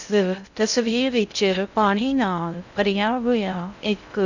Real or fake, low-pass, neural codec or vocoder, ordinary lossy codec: fake; 7.2 kHz; codec, 16 kHz in and 24 kHz out, 0.6 kbps, FocalCodec, streaming, 2048 codes; none